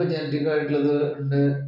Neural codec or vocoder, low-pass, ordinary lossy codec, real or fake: none; 5.4 kHz; none; real